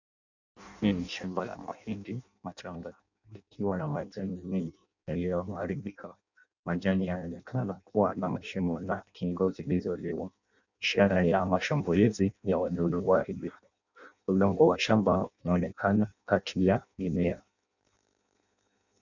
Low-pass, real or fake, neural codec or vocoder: 7.2 kHz; fake; codec, 16 kHz in and 24 kHz out, 0.6 kbps, FireRedTTS-2 codec